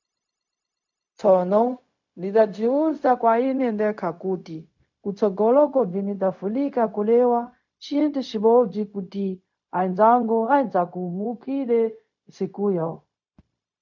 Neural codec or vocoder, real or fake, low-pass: codec, 16 kHz, 0.4 kbps, LongCat-Audio-Codec; fake; 7.2 kHz